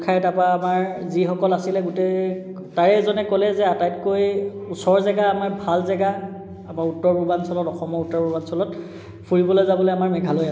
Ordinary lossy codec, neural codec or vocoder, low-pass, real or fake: none; none; none; real